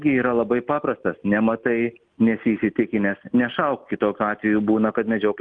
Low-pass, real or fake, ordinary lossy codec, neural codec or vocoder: 9.9 kHz; real; Opus, 32 kbps; none